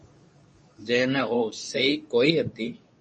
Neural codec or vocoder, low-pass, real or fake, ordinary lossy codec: codec, 24 kHz, 0.9 kbps, WavTokenizer, medium speech release version 2; 10.8 kHz; fake; MP3, 32 kbps